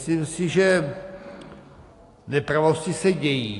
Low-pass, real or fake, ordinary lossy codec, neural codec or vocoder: 10.8 kHz; real; AAC, 48 kbps; none